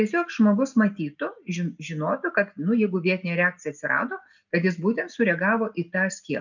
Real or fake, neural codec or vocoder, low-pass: real; none; 7.2 kHz